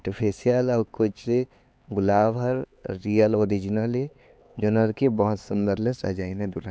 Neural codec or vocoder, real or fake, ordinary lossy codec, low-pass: codec, 16 kHz, 4 kbps, X-Codec, HuBERT features, trained on LibriSpeech; fake; none; none